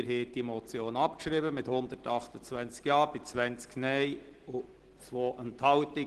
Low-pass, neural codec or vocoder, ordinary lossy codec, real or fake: 10.8 kHz; none; Opus, 16 kbps; real